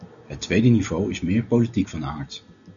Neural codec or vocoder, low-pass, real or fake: none; 7.2 kHz; real